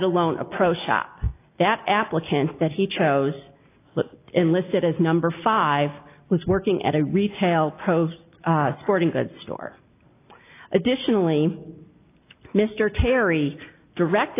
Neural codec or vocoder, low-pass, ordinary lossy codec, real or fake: none; 3.6 kHz; AAC, 24 kbps; real